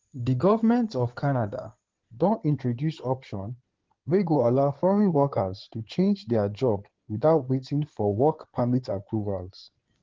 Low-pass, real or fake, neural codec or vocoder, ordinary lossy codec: 7.2 kHz; fake; codec, 16 kHz in and 24 kHz out, 2.2 kbps, FireRedTTS-2 codec; Opus, 16 kbps